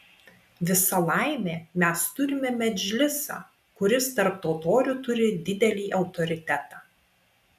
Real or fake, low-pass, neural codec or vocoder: real; 14.4 kHz; none